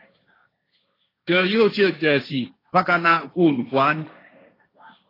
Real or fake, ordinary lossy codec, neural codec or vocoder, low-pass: fake; AAC, 24 kbps; codec, 16 kHz, 1.1 kbps, Voila-Tokenizer; 5.4 kHz